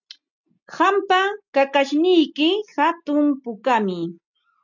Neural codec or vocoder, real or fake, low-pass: none; real; 7.2 kHz